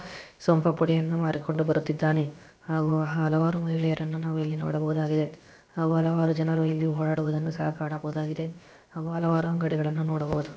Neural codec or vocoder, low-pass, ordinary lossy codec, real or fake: codec, 16 kHz, about 1 kbps, DyCAST, with the encoder's durations; none; none; fake